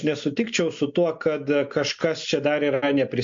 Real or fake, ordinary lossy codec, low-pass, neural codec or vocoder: real; MP3, 48 kbps; 7.2 kHz; none